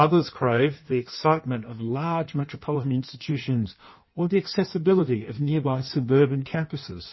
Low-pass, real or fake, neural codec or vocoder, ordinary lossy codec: 7.2 kHz; fake; codec, 16 kHz in and 24 kHz out, 1.1 kbps, FireRedTTS-2 codec; MP3, 24 kbps